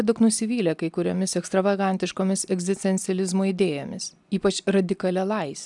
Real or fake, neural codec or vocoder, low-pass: real; none; 10.8 kHz